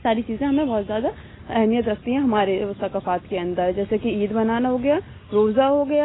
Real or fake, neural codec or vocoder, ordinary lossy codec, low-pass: real; none; AAC, 16 kbps; 7.2 kHz